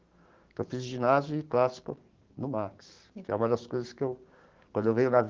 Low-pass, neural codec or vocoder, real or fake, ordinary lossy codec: 7.2 kHz; codec, 16 kHz, 6 kbps, DAC; fake; Opus, 16 kbps